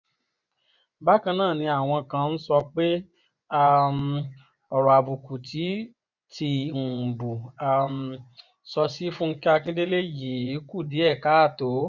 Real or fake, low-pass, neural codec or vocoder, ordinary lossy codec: fake; 7.2 kHz; vocoder, 24 kHz, 100 mel bands, Vocos; none